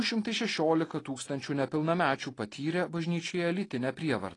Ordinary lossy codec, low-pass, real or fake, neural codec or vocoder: AAC, 32 kbps; 10.8 kHz; real; none